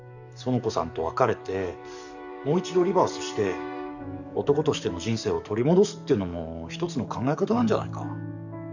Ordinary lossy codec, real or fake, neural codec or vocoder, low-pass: none; fake; codec, 44.1 kHz, 7.8 kbps, DAC; 7.2 kHz